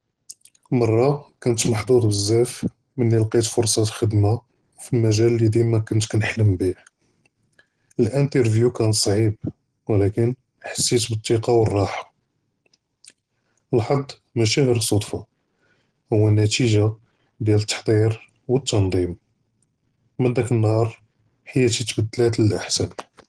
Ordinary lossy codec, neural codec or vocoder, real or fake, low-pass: Opus, 16 kbps; none; real; 9.9 kHz